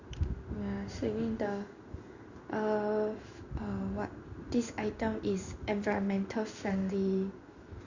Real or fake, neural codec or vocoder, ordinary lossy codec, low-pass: fake; codec, 16 kHz in and 24 kHz out, 1 kbps, XY-Tokenizer; Opus, 64 kbps; 7.2 kHz